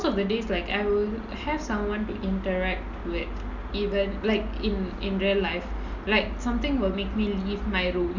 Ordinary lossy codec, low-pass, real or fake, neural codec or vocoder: none; 7.2 kHz; real; none